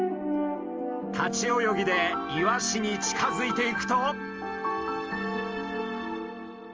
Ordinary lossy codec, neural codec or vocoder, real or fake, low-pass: Opus, 24 kbps; none; real; 7.2 kHz